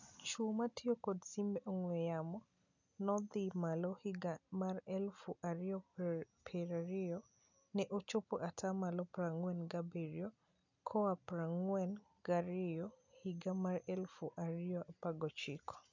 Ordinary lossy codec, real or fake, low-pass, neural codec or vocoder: none; real; 7.2 kHz; none